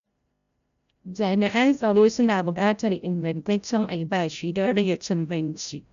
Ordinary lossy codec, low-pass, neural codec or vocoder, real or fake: AAC, 96 kbps; 7.2 kHz; codec, 16 kHz, 0.5 kbps, FreqCodec, larger model; fake